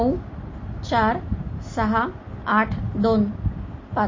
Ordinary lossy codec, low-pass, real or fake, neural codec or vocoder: MP3, 32 kbps; 7.2 kHz; real; none